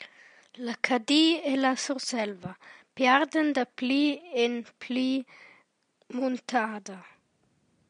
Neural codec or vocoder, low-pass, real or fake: none; 9.9 kHz; real